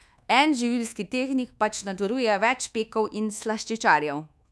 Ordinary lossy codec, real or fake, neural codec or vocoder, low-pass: none; fake; codec, 24 kHz, 1.2 kbps, DualCodec; none